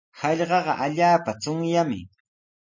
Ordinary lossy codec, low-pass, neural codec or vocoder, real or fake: MP3, 32 kbps; 7.2 kHz; none; real